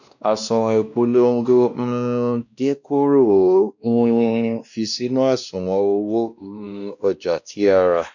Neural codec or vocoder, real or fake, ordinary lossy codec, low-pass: codec, 16 kHz, 1 kbps, X-Codec, WavLM features, trained on Multilingual LibriSpeech; fake; none; 7.2 kHz